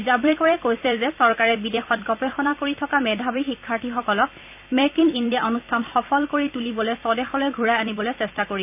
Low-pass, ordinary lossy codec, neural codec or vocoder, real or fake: 3.6 kHz; none; vocoder, 44.1 kHz, 128 mel bands every 256 samples, BigVGAN v2; fake